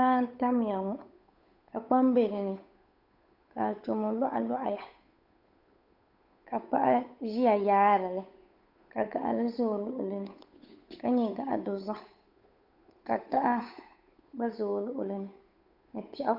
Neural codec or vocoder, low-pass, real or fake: codec, 16 kHz, 8 kbps, FunCodec, trained on Chinese and English, 25 frames a second; 5.4 kHz; fake